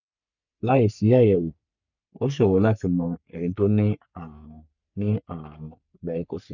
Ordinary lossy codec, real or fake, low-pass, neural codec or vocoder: none; fake; 7.2 kHz; codec, 44.1 kHz, 3.4 kbps, Pupu-Codec